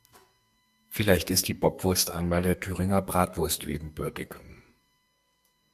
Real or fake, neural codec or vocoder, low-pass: fake; codec, 32 kHz, 1.9 kbps, SNAC; 14.4 kHz